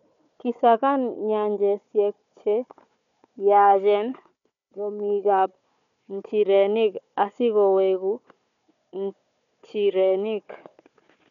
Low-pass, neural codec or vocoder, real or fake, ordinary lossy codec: 7.2 kHz; codec, 16 kHz, 4 kbps, FunCodec, trained on Chinese and English, 50 frames a second; fake; none